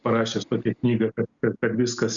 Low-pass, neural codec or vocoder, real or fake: 7.2 kHz; none; real